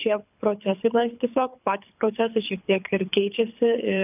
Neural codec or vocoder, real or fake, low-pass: codec, 24 kHz, 6 kbps, HILCodec; fake; 3.6 kHz